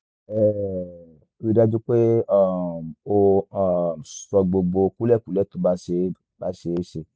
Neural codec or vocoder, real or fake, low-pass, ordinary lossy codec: none; real; none; none